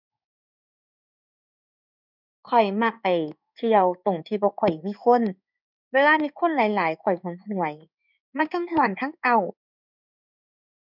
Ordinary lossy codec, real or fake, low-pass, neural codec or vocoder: none; fake; 5.4 kHz; codec, 16 kHz in and 24 kHz out, 1 kbps, XY-Tokenizer